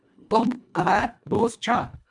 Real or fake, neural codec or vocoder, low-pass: fake; codec, 24 kHz, 1.5 kbps, HILCodec; 10.8 kHz